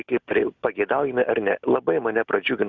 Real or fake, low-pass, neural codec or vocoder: real; 7.2 kHz; none